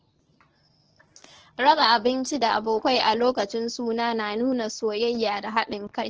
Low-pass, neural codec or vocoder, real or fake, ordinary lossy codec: 7.2 kHz; codec, 24 kHz, 0.9 kbps, WavTokenizer, medium speech release version 1; fake; Opus, 16 kbps